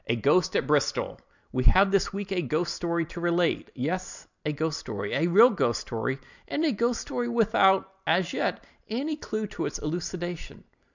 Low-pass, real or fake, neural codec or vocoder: 7.2 kHz; real; none